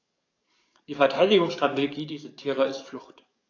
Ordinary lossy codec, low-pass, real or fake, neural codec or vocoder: AAC, 48 kbps; 7.2 kHz; fake; codec, 44.1 kHz, 7.8 kbps, DAC